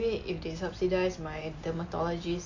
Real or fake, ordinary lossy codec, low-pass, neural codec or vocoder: real; AAC, 48 kbps; 7.2 kHz; none